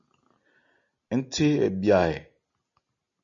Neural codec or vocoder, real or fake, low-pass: none; real; 7.2 kHz